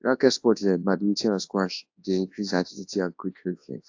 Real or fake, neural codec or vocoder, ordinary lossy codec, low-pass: fake; codec, 24 kHz, 0.9 kbps, WavTokenizer, large speech release; AAC, 48 kbps; 7.2 kHz